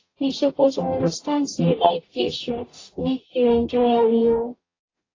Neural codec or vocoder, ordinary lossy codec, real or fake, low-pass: codec, 44.1 kHz, 0.9 kbps, DAC; AAC, 32 kbps; fake; 7.2 kHz